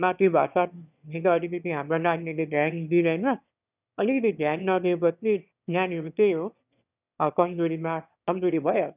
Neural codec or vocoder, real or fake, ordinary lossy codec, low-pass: autoencoder, 22.05 kHz, a latent of 192 numbers a frame, VITS, trained on one speaker; fake; none; 3.6 kHz